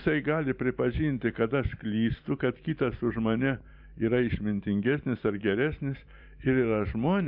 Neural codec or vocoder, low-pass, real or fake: vocoder, 44.1 kHz, 128 mel bands every 512 samples, BigVGAN v2; 5.4 kHz; fake